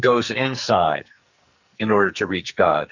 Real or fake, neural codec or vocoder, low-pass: fake; codec, 44.1 kHz, 2.6 kbps, SNAC; 7.2 kHz